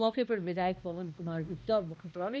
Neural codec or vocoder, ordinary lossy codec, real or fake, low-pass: codec, 16 kHz, 1 kbps, X-Codec, HuBERT features, trained on balanced general audio; none; fake; none